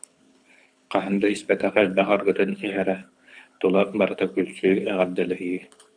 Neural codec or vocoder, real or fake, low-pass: codec, 24 kHz, 6 kbps, HILCodec; fake; 9.9 kHz